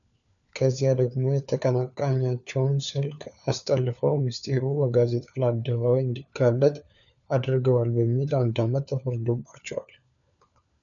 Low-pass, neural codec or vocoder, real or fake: 7.2 kHz; codec, 16 kHz, 4 kbps, FunCodec, trained on LibriTTS, 50 frames a second; fake